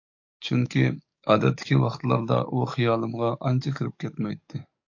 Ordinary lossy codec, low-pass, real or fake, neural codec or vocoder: AAC, 48 kbps; 7.2 kHz; fake; codec, 44.1 kHz, 7.8 kbps, DAC